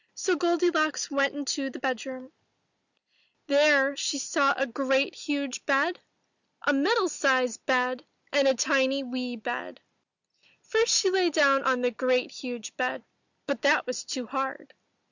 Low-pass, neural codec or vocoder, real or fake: 7.2 kHz; none; real